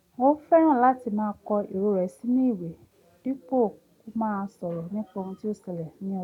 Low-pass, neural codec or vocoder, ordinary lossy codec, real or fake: 19.8 kHz; none; none; real